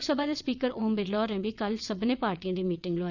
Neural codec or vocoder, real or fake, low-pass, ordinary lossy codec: vocoder, 22.05 kHz, 80 mel bands, WaveNeXt; fake; 7.2 kHz; none